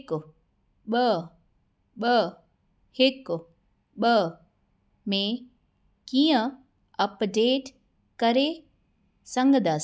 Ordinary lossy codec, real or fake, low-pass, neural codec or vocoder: none; real; none; none